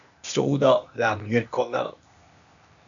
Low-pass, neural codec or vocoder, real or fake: 7.2 kHz; codec, 16 kHz, 0.8 kbps, ZipCodec; fake